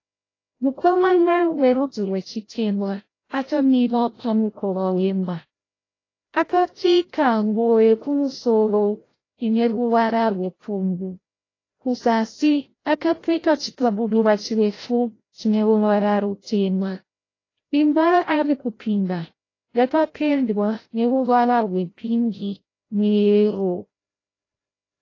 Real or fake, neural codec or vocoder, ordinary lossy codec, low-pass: fake; codec, 16 kHz, 0.5 kbps, FreqCodec, larger model; AAC, 32 kbps; 7.2 kHz